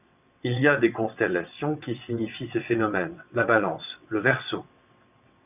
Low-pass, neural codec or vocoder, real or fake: 3.6 kHz; vocoder, 22.05 kHz, 80 mel bands, WaveNeXt; fake